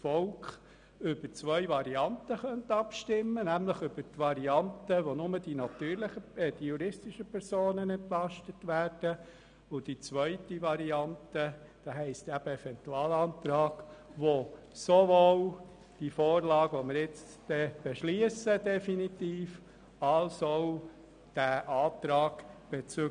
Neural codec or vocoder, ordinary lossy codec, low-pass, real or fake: none; none; 9.9 kHz; real